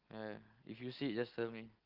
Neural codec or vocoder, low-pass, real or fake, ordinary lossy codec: none; 5.4 kHz; real; Opus, 16 kbps